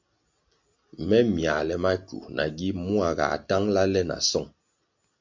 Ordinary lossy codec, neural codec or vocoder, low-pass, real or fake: MP3, 64 kbps; none; 7.2 kHz; real